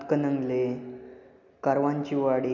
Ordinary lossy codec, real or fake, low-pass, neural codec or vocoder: none; real; 7.2 kHz; none